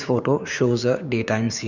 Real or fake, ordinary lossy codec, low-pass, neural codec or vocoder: fake; none; 7.2 kHz; codec, 16 kHz, 6 kbps, DAC